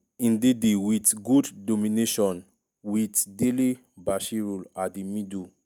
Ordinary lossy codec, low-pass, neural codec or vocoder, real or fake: none; none; none; real